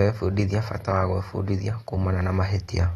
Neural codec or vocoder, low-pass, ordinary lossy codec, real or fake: none; 14.4 kHz; AAC, 32 kbps; real